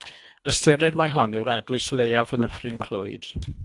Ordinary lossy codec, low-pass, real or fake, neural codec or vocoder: AAC, 64 kbps; 10.8 kHz; fake; codec, 24 kHz, 1.5 kbps, HILCodec